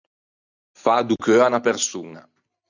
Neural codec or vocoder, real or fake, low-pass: none; real; 7.2 kHz